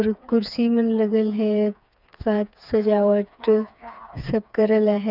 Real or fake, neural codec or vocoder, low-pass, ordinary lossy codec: fake; codec, 16 kHz, 4 kbps, FreqCodec, smaller model; 5.4 kHz; none